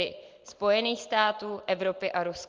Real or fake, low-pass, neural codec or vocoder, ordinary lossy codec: real; 7.2 kHz; none; Opus, 32 kbps